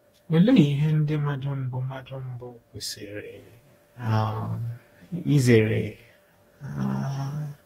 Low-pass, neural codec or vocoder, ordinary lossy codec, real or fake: 19.8 kHz; codec, 44.1 kHz, 2.6 kbps, DAC; AAC, 48 kbps; fake